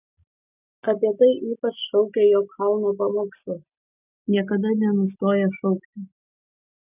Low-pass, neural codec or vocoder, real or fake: 3.6 kHz; none; real